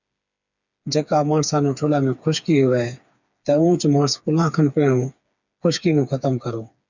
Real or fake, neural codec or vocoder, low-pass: fake; codec, 16 kHz, 4 kbps, FreqCodec, smaller model; 7.2 kHz